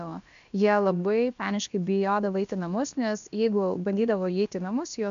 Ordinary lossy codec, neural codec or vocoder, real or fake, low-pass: AAC, 96 kbps; codec, 16 kHz, about 1 kbps, DyCAST, with the encoder's durations; fake; 7.2 kHz